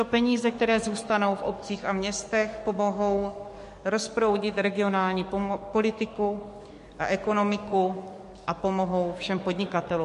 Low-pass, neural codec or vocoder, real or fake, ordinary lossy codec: 14.4 kHz; codec, 44.1 kHz, 7.8 kbps, DAC; fake; MP3, 48 kbps